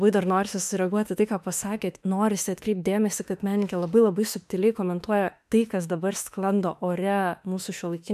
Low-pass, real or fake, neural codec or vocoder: 14.4 kHz; fake; autoencoder, 48 kHz, 32 numbers a frame, DAC-VAE, trained on Japanese speech